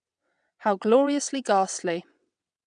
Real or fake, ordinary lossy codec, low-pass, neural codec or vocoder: fake; none; 9.9 kHz; vocoder, 22.05 kHz, 80 mel bands, WaveNeXt